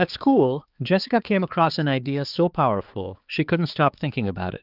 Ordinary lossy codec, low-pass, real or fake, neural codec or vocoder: Opus, 32 kbps; 5.4 kHz; fake; codec, 16 kHz, 4 kbps, X-Codec, HuBERT features, trained on balanced general audio